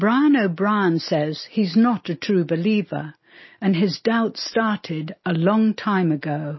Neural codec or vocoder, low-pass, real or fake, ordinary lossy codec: none; 7.2 kHz; real; MP3, 24 kbps